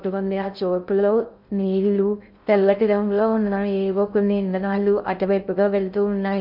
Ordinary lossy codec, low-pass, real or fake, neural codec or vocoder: none; 5.4 kHz; fake; codec, 16 kHz in and 24 kHz out, 0.6 kbps, FocalCodec, streaming, 2048 codes